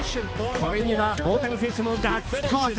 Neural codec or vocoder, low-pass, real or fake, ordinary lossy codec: codec, 16 kHz, 2 kbps, X-Codec, HuBERT features, trained on balanced general audio; none; fake; none